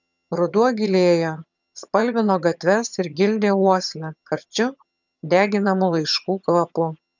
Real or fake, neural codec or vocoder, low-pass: fake; vocoder, 22.05 kHz, 80 mel bands, HiFi-GAN; 7.2 kHz